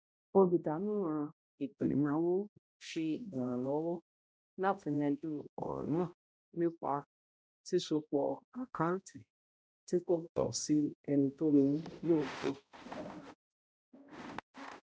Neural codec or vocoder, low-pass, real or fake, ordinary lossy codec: codec, 16 kHz, 0.5 kbps, X-Codec, HuBERT features, trained on balanced general audio; none; fake; none